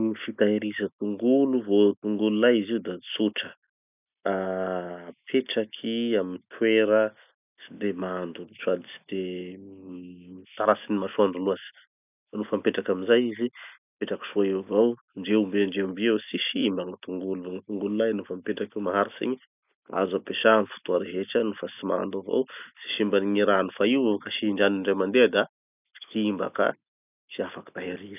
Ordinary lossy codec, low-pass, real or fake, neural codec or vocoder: none; 3.6 kHz; real; none